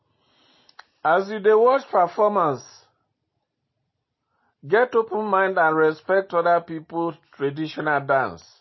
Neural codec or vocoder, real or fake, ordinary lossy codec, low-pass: none; real; MP3, 24 kbps; 7.2 kHz